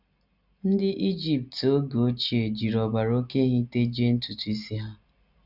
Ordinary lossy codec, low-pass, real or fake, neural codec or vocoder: none; 5.4 kHz; real; none